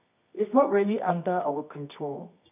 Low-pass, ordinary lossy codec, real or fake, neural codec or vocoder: 3.6 kHz; none; fake; codec, 24 kHz, 0.9 kbps, WavTokenizer, medium music audio release